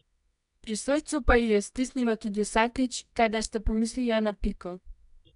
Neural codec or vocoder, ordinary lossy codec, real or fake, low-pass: codec, 24 kHz, 0.9 kbps, WavTokenizer, medium music audio release; none; fake; 10.8 kHz